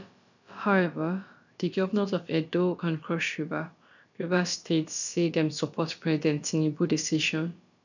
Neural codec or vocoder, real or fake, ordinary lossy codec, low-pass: codec, 16 kHz, about 1 kbps, DyCAST, with the encoder's durations; fake; none; 7.2 kHz